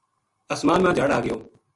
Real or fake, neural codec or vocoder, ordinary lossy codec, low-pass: real; none; Opus, 64 kbps; 10.8 kHz